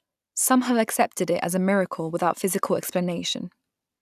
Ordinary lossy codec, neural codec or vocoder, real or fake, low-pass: none; none; real; 14.4 kHz